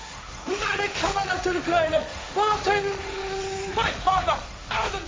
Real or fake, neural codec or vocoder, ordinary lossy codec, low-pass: fake; codec, 16 kHz, 1.1 kbps, Voila-Tokenizer; none; none